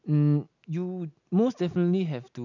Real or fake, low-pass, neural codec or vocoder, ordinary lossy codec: real; 7.2 kHz; none; none